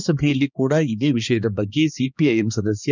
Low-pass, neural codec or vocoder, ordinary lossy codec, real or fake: 7.2 kHz; codec, 16 kHz, 2 kbps, X-Codec, HuBERT features, trained on balanced general audio; none; fake